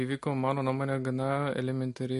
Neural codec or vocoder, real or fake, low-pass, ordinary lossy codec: none; real; 14.4 kHz; MP3, 48 kbps